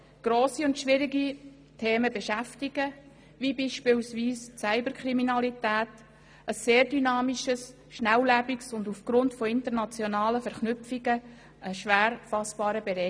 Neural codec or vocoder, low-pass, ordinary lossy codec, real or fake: none; none; none; real